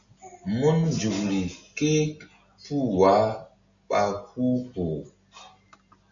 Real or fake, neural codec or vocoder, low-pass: real; none; 7.2 kHz